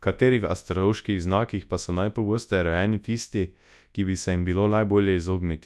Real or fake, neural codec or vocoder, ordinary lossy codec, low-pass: fake; codec, 24 kHz, 0.9 kbps, WavTokenizer, large speech release; none; none